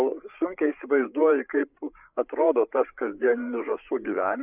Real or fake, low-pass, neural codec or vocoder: fake; 3.6 kHz; codec, 16 kHz, 8 kbps, FreqCodec, larger model